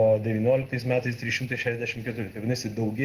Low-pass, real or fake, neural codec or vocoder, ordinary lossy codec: 14.4 kHz; real; none; Opus, 16 kbps